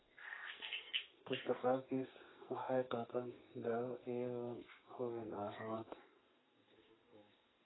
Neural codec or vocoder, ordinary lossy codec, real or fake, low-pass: codec, 44.1 kHz, 2.6 kbps, SNAC; AAC, 16 kbps; fake; 7.2 kHz